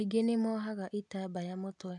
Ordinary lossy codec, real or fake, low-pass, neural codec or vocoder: none; real; 10.8 kHz; none